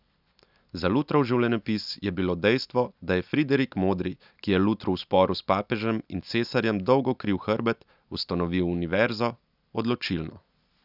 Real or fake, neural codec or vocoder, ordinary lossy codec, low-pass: real; none; none; 5.4 kHz